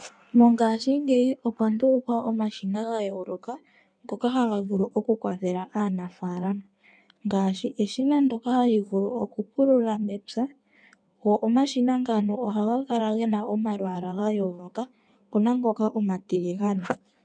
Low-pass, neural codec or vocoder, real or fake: 9.9 kHz; codec, 16 kHz in and 24 kHz out, 1.1 kbps, FireRedTTS-2 codec; fake